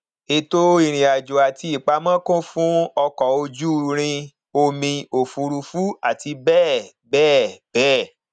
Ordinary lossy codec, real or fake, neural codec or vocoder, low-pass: none; real; none; 9.9 kHz